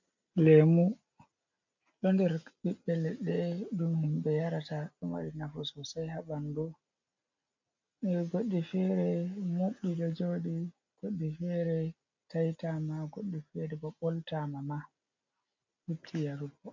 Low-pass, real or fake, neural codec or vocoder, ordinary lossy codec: 7.2 kHz; real; none; MP3, 48 kbps